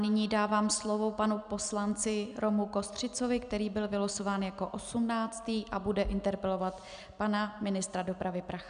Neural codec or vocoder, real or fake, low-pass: none; real; 9.9 kHz